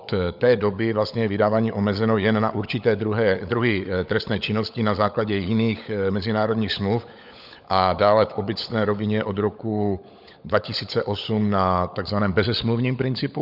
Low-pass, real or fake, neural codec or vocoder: 5.4 kHz; fake; codec, 16 kHz, 16 kbps, FunCodec, trained on LibriTTS, 50 frames a second